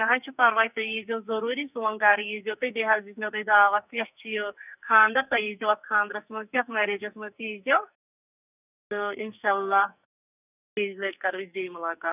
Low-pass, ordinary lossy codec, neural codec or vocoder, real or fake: 3.6 kHz; none; codec, 44.1 kHz, 2.6 kbps, SNAC; fake